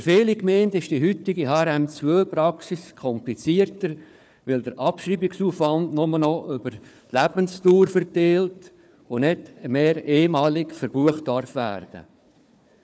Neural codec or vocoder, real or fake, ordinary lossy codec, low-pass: codec, 16 kHz, 4 kbps, FunCodec, trained on Chinese and English, 50 frames a second; fake; none; none